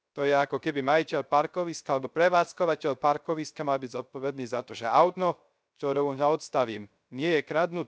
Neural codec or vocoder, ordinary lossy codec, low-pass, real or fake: codec, 16 kHz, 0.3 kbps, FocalCodec; none; none; fake